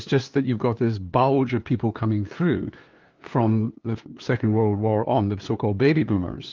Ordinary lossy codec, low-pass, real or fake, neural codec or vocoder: Opus, 32 kbps; 7.2 kHz; fake; codec, 16 kHz, 2 kbps, FunCodec, trained on LibriTTS, 25 frames a second